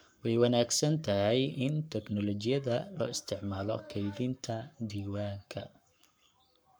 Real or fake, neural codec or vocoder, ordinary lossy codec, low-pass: fake; codec, 44.1 kHz, 7.8 kbps, Pupu-Codec; none; none